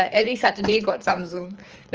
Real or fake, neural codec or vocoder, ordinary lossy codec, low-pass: fake; codec, 24 kHz, 3 kbps, HILCodec; Opus, 24 kbps; 7.2 kHz